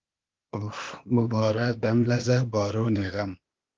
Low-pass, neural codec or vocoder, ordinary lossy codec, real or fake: 7.2 kHz; codec, 16 kHz, 0.8 kbps, ZipCodec; Opus, 32 kbps; fake